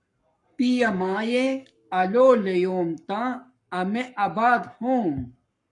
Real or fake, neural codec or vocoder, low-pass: fake; codec, 44.1 kHz, 7.8 kbps, Pupu-Codec; 10.8 kHz